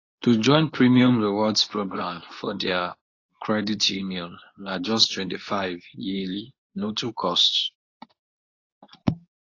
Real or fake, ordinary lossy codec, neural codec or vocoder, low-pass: fake; AAC, 32 kbps; codec, 24 kHz, 0.9 kbps, WavTokenizer, medium speech release version 2; 7.2 kHz